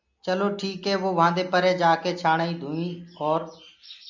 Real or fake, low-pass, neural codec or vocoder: real; 7.2 kHz; none